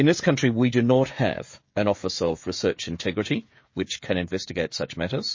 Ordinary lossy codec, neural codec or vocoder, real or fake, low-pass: MP3, 32 kbps; codec, 16 kHz, 16 kbps, FreqCodec, smaller model; fake; 7.2 kHz